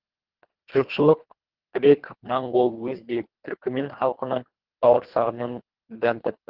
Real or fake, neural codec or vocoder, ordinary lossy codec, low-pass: fake; codec, 24 kHz, 1.5 kbps, HILCodec; Opus, 16 kbps; 5.4 kHz